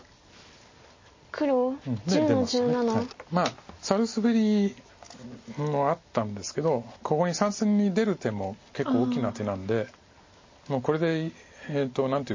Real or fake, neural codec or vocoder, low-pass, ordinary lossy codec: real; none; 7.2 kHz; MP3, 32 kbps